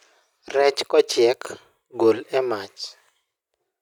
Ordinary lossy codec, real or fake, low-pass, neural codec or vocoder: none; real; 19.8 kHz; none